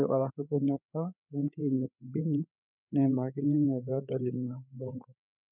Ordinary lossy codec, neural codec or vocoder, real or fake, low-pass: none; codec, 16 kHz, 4 kbps, FreqCodec, larger model; fake; 3.6 kHz